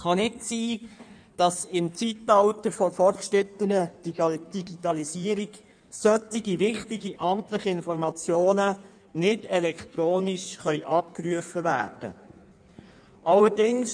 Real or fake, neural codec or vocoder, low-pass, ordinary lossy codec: fake; codec, 16 kHz in and 24 kHz out, 1.1 kbps, FireRedTTS-2 codec; 9.9 kHz; none